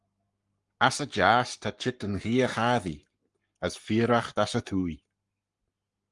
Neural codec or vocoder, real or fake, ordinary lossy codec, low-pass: codec, 44.1 kHz, 7.8 kbps, Pupu-Codec; fake; Opus, 32 kbps; 10.8 kHz